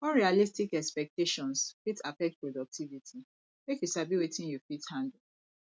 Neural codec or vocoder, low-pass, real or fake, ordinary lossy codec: none; none; real; none